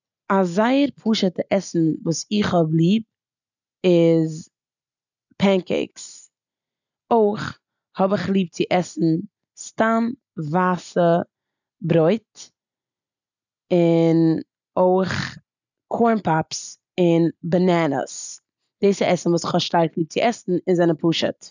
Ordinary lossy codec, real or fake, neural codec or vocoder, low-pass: none; real; none; 7.2 kHz